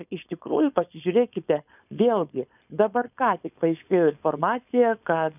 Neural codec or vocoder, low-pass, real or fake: codec, 16 kHz, 4.8 kbps, FACodec; 3.6 kHz; fake